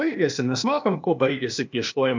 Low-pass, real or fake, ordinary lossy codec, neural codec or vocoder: 7.2 kHz; fake; MP3, 64 kbps; codec, 16 kHz, 0.8 kbps, ZipCodec